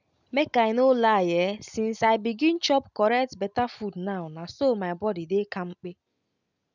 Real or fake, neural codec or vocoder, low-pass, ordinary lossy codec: real; none; 7.2 kHz; none